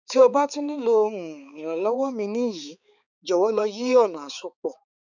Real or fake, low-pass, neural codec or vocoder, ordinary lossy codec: fake; 7.2 kHz; codec, 16 kHz, 4 kbps, X-Codec, HuBERT features, trained on balanced general audio; none